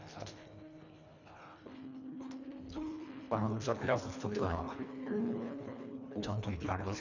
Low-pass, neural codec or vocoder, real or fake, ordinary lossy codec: 7.2 kHz; codec, 24 kHz, 1.5 kbps, HILCodec; fake; none